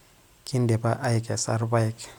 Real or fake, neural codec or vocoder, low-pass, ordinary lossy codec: real; none; 19.8 kHz; none